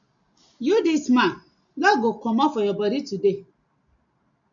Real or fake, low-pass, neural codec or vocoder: real; 7.2 kHz; none